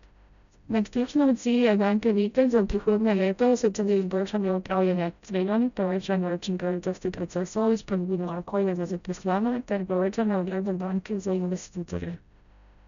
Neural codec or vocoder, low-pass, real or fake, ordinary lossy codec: codec, 16 kHz, 0.5 kbps, FreqCodec, smaller model; 7.2 kHz; fake; none